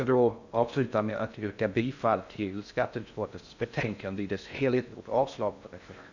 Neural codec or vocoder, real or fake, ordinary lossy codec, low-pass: codec, 16 kHz in and 24 kHz out, 0.6 kbps, FocalCodec, streaming, 2048 codes; fake; none; 7.2 kHz